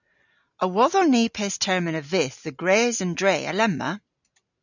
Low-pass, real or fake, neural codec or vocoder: 7.2 kHz; real; none